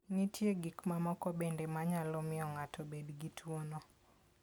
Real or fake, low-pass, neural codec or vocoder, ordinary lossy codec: real; none; none; none